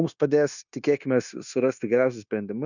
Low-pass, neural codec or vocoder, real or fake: 7.2 kHz; codec, 16 kHz, 0.9 kbps, LongCat-Audio-Codec; fake